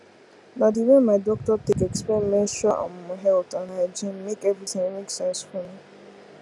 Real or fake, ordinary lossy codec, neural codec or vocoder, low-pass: real; none; none; none